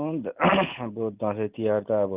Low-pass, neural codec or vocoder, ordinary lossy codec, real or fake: 3.6 kHz; none; Opus, 24 kbps; real